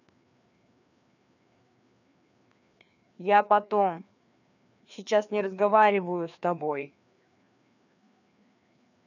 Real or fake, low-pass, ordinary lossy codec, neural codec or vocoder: fake; 7.2 kHz; none; codec, 16 kHz, 2 kbps, FreqCodec, larger model